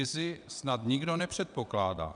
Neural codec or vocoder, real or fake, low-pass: vocoder, 22.05 kHz, 80 mel bands, WaveNeXt; fake; 9.9 kHz